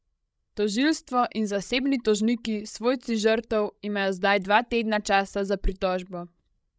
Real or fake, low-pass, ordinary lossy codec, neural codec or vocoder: fake; none; none; codec, 16 kHz, 16 kbps, FreqCodec, larger model